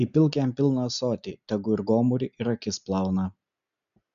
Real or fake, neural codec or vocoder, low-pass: real; none; 7.2 kHz